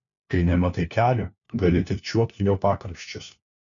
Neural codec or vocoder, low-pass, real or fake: codec, 16 kHz, 1 kbps, FunCodec, trained on LibriTTS, 50 frames a second; 7.2 kHz; fake